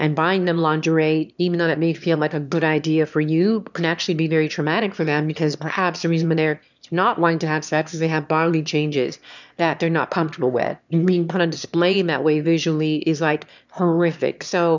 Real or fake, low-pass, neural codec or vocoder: fake; 7.2 kHz; autoencoder, 22.05 kHz, a latent of 192 numbers a frame, VITS, trained on one speaker